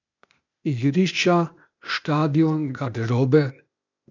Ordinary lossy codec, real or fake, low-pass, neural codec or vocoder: none; fake; 7.2 kHz; codec, 16 kHz, 0.8 kbps, ZipCodec